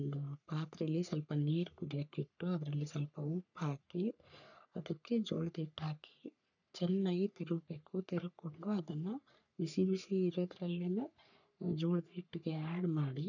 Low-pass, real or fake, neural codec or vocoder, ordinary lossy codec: 7.2 kHz; fake; codec, 44.1 kHz, 3.4 kbps, Pupu-Codec; none